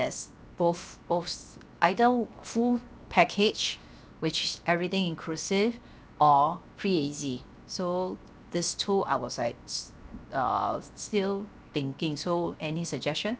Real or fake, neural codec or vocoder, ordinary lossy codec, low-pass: fake; codec, 16 kHz, 0.7 kbps, FocalCodec; none; none